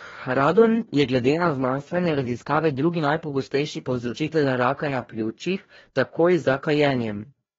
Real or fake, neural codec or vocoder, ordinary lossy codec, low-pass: fake; codec, 16 kHz, 1 kbps, FreqCodec, larger model; AAC, 24 kbps; 7.2 kHz